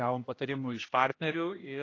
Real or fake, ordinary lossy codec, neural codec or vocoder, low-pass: fake; AAC, 32 kbps; codec, 16 kHz, 1 kbps, X-Codec, HuBERT features, trained on general audio; 7.2 kHz